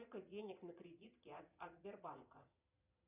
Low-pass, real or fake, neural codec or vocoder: 3.6 kHz; fake; vocoder, 22.05 kHz, 80 mel bands, Vocos